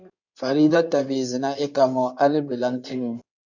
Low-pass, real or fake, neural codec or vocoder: 7.2 kHz; fake; codec, 16 kHz in and 24 kHz out, 2.2 kbps, FireRedTTS-2 codec